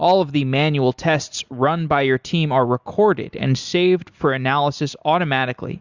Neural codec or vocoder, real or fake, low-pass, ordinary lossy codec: none; real; 7.2 kHz; Opus, 64 kbps